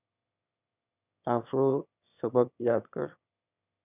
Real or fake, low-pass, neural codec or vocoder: fake; 3.6 kHz; autoencoder, 22.05 kHz, a latent of 192 numbers a frame, VITS, trained on one speaker